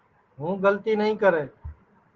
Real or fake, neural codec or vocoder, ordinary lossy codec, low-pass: real; none; Opus, 16 kbps; 7.2 kHz